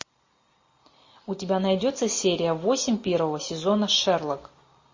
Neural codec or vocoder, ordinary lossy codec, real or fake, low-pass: none; MP3, 32 kbps; real; 7.2 kHz